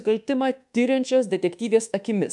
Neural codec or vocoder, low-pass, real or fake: codec, 24 kHz, 1.2 kbps, DualCodec; 10.8 kHz; fake